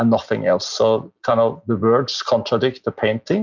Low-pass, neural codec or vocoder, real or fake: 7.2 kHz; none; real